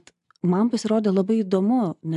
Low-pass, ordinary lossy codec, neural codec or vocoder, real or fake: 10.8 kHz; MP3, 96 kbps; none; real